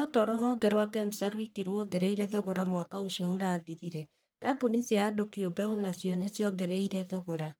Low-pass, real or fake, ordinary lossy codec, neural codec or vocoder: none; fake; none; codec, 44.1 kHz, 1.7 kbps, Pupu-Codec